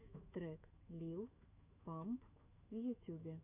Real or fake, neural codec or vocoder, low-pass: fake; autoencoder, 48 kHz, 128 numbers a frame, DAC-VAE, trained on Japanese speech; 3.6 kHz